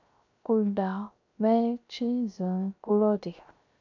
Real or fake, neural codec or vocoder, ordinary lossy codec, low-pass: fake; codec, 16 kHz, 0.3 kbps, FocalCodec; MP3, 64 kbps; 7.2 kHz